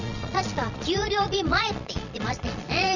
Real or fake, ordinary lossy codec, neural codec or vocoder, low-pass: fake; none; vocoder, 22.05 kHz, 80 mel bands, Vocos; 7.2 kHz